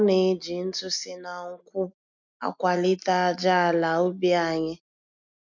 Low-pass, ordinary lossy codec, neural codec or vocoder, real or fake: 7.2 kHz; none; none; real